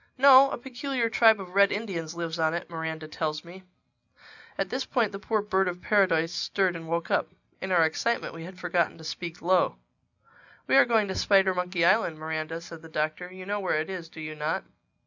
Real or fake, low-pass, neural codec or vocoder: real; 7.2 kHz; none